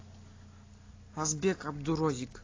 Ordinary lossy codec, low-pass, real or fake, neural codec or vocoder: AAC, 32 kbps; 7.2 kHz; real; none